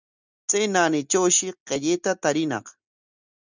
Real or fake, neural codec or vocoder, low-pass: real; none; 7.2 kHz